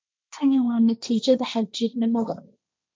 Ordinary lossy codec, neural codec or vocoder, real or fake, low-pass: none; codec, 16 kHz, 1.1 kbps, Voila-Tokenizer; fake; none